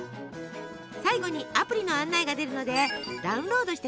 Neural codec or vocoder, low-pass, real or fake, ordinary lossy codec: none; none; real; none